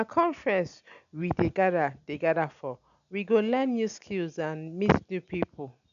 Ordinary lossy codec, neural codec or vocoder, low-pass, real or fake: none; none; 7.2 kHz; real